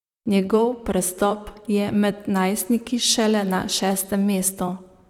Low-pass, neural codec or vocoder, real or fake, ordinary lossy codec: 19.8 kHz; vocoder, 44.1 kHz, 128 mel bands, Pupu-Vocoder; fake; none